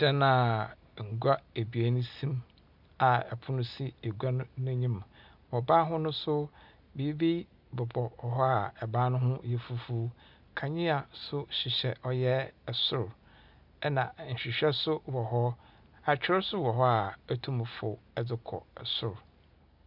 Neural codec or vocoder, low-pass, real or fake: none; 5.4 kHz; real